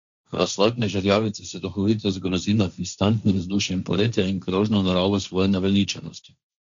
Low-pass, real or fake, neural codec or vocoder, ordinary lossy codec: 7.2 kHz; fake; codec, 16 kHz, 1.1 kbps, Voila-Tokenizer; MP3, 64 kbps